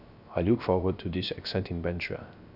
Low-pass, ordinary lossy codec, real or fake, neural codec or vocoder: 5.4 kHz; none; fake; codec, 16 kHz, 0.3 kbps, FocalCodec